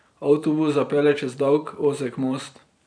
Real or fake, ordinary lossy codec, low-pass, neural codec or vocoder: fake; none; 9.9 kHz; vocoder, 44.1 kHz, 128 mel bands every 512 samples, BigVGAN v2